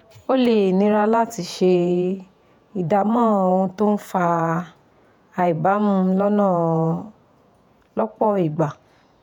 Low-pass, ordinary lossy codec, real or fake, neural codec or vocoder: 19.8 kHz; none; fake; vocoder, 48 kHz, 128 mel bands, Vocos